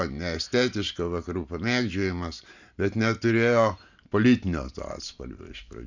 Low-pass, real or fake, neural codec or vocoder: 7.2 kHz; real; none